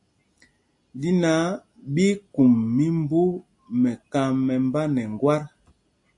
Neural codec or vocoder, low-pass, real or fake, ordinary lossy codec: none; 10.8 kHz; real; MP3, 48 kbps